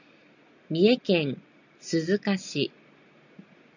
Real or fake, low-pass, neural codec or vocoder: real; 7.2 kHz; none